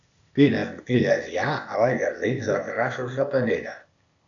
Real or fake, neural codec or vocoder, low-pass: fake; codec, 16 kHz, 0.8 kbps, ZipCodec; 7.2 kHz